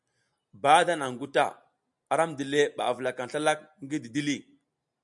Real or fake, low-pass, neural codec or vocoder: real; 10.8 kHz; none